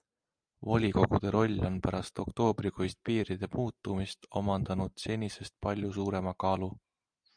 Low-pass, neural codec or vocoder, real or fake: 9.9 kHz; none; real